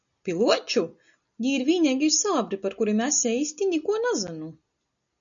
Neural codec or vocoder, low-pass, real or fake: none; 7.2 kHz; real